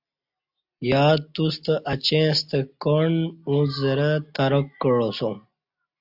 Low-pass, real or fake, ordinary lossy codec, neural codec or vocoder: 5.4 kHz; real; AAC, 48 kbps; none